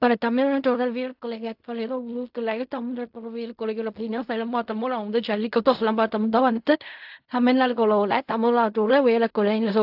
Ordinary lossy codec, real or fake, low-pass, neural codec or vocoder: AAC, 48 kbps; fake; 5.4 kHz; codec, 16 kHz in and 24 kHz out, 0.4 kbps, LongCat-Audio-Codec, fine tuned four codebook decoder